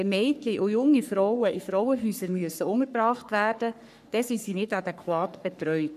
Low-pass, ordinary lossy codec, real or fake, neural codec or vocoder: 14.4 kHz; none; fake; codec, 44.1 kHz, 3.4 kbps, Pupu-Codec